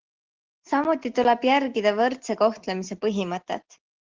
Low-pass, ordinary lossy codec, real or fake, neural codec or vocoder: 7.2 kHz; Opus, 16 kbps; real; none